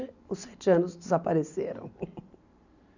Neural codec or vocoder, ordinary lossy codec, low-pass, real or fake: none; none; 7.2 kHz; real